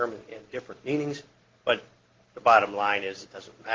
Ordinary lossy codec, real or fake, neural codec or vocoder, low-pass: Opus, 16 kbps; real; none; 7.2 kHz